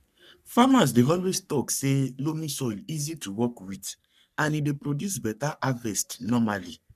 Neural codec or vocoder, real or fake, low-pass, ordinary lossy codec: codec, 44.1 kHz, 3.4 kbps, Pupu-Codec; fake; 14.4 kHz; none